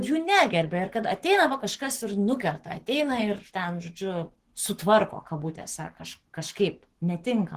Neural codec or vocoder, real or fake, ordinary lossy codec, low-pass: codec, 44.1 kHz, 7.8 kbps, Pupu-Codec; fake; Opus, 16 kbps; 14.4 kHz